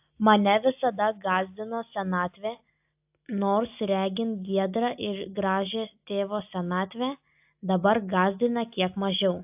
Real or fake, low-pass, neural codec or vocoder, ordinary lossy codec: real; 3.6 kHz; none; AAC, 32 kbps